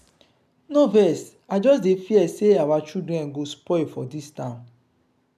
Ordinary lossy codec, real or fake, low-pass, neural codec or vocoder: none; real; 14.4 kHz; none